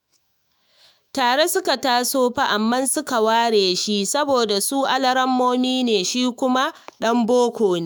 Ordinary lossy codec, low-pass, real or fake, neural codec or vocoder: none; none; fake; autoencoder, 48 kHz, 128 numbers a frame, DAC-VAE, trained on Japanese speech